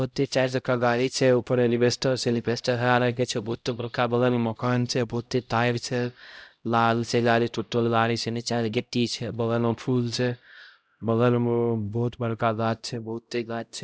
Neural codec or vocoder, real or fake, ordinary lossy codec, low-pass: codec, 16 kHz, 0.5 kbps, X-Codec, HuBERT features, trained on LibriSpeech; fake; none; none